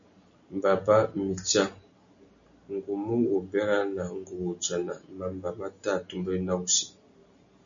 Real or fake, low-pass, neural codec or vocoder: real; 7.2 kHz; none